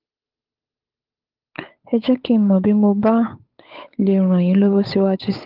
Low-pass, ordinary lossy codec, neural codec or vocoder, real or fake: 5.4 kHz; Opus, 32 kbps; codec, 16 kHz, 8 kbps, FunCodec, trained on Chinese and English, 25 frames a second; fake